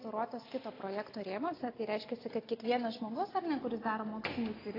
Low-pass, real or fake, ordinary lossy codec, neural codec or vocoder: 5.4 kHz; real; AAC, 24 kbps; none